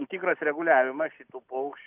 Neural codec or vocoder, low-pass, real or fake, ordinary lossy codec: none; 3.6 kHz; real; AAC, 32 kbps